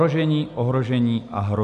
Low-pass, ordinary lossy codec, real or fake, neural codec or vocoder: 10.8 kHz; MP3, 96 kbps; real; none